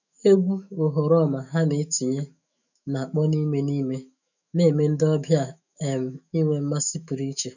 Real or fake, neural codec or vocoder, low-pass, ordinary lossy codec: fake; autoencoder, 48 kHz, 128 numbers a frame, DAC-VAE, trained on Japanese speech; 7.2 kHz; none